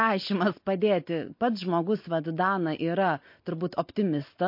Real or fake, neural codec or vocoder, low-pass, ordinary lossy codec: real; none; 5.4 kHz; MP3, 32 kbps